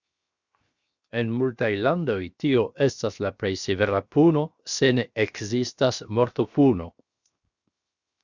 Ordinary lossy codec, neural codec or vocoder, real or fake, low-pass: Opus, 64 kbps; codec, 16 kHz, 0.7 kbps, FocalCodec; fake; 7.2 kHz